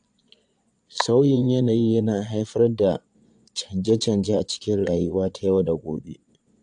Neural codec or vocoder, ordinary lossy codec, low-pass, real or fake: vocoder, 22.05 kHz, 80 mel bands, Vocos; AAC, 64 kbps; 9.9 kHz; fake